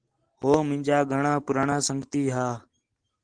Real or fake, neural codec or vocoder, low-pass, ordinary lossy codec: real; none; 9.9 kHz; Opus, 16 kbps